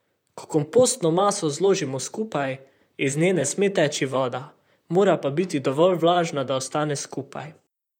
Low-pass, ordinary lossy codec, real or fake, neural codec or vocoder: 19.8 kHz; none; fake; vocoder, 44.1 kHz, 128 mel bands, Pupu-Vocoder